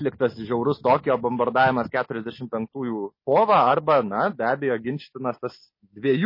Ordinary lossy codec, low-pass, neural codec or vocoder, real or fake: MP3, 24 kbps; 5.4 kHz; none; real